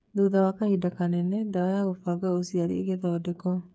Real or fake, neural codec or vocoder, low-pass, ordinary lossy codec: fake; codec, 16 kHz, 8 kbps, FreqCodec, smaller model; none; none